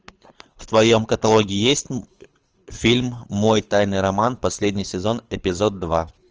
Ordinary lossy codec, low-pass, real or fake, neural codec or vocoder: Opus, 24 kbps; 7.2 kHz; fake; codec, 24 kHz, 6 kbps, HILCodec